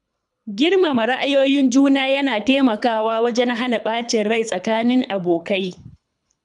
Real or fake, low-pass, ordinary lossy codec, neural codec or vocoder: fake; 10.8 kHz; none; codec, 24 kHz, 3 kbps, HILCodec